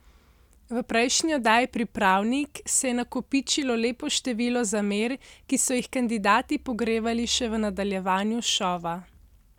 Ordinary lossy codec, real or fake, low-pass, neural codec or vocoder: none; real; 19.8 kHz; none